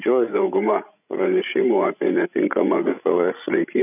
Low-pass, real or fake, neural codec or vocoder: 3.6 kHz; fake; codec, 16 kHz, 16 kbps, FreqCodec, larger model